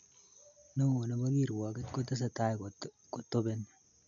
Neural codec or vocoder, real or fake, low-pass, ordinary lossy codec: none; real; 7.2 kHz; none